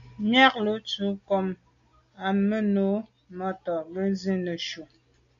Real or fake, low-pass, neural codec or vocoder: real; 7.2 kHz; none